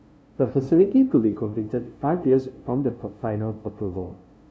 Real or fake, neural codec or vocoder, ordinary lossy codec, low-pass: fake; codec, 16 kHz, 0.5 kbps, FunCodec, trained on LibriTTS, 25 frames a second; none; none